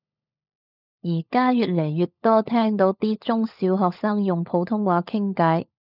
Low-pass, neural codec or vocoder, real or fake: 5.4 kHz; codec, 16 kHz, 16 kbps, FunCodec, trained on LibriTTS, 50 frames a second; fake